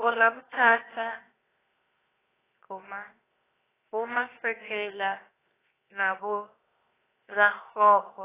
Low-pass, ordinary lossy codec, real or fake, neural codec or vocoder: 3.6 kHz; AAC, 16 kbps; fake; codec, 16 kHz, 0.7 kbps, FocalCodec